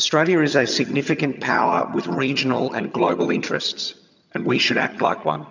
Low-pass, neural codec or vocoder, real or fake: 7.2 kHz; vocoder, 22.05 kHz, 80 mel bands, HiFi-GAN; fake